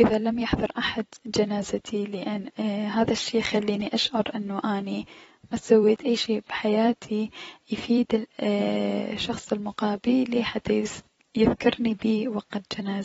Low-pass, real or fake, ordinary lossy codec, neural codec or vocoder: 19.8 kHz; fake; AAC, 24 kbps; vocoder, 44.1 kHz, 128 mel bands every 256 samples, BigVGAN v2